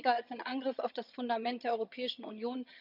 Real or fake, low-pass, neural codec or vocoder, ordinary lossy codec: fake; 5.4 kHz; vocoder, 22.05 kHz, 80 mel bands, HiFi-GAN; none